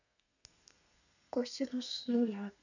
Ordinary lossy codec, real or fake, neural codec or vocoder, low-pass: none; fake; codec, 32 kHz, 1.9 kbps, SNAC; 7.2 kHz